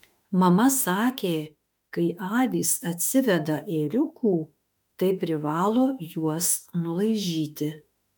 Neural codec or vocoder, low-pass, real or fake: autoencoder, 48 kHz, 32 numbers a frame, DAC-VAE, trained on Japanese speech; 19.8 kHz; fake